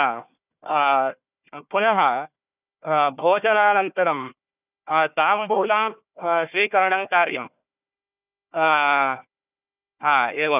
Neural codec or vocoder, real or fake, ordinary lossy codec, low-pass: codec, 16 kHz, 1 kbps, FunCodec, trained on Chinese and English, 50 frames a second; fake; none; 3.6 kHz